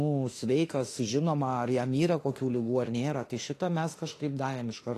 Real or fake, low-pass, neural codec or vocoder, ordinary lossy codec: fake; 14.4 kHz; autoencoder, 48 kHz, 32 numbers a frame, DAC-VAE, trained on Japanese speech; AAC, 48 kbps